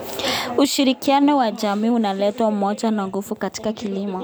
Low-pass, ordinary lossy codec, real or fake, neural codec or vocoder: none; none; real; none